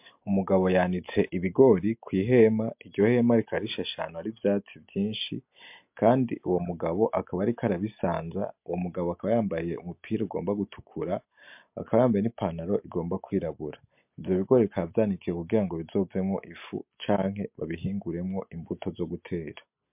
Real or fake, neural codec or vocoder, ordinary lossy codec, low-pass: real; none; MP3, 32 kbps; 3.6 kHz